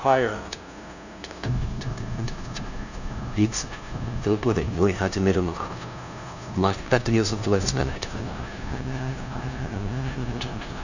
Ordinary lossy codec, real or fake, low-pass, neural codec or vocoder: none; fake; 7.2 kHz; codec, 16 kHz, 0.5 kbps, FunCodec, trained on LibriTTS, 25 frames a second